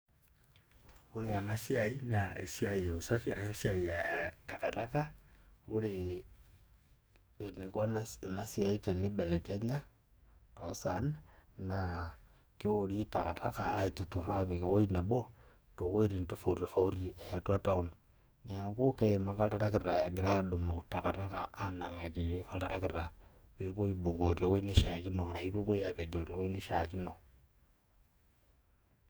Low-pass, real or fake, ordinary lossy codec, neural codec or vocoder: none; fake; none; codec, 44.1 kHz, 2.6 kbps, DAC